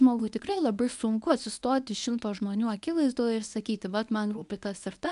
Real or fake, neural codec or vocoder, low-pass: fake; codec, 24 kHz, 0.9 kbps, WavTokenizer, medium speech release version 1; 10.8 kHz